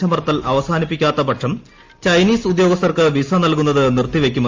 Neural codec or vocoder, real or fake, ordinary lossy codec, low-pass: none; real; Opus, 32 kbps; 7.2 kHz